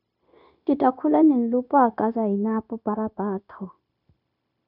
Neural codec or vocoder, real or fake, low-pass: codec, 16 kHz, 0.9 kbps, LongCat-Audio-Codec; fake; 5.4 kHz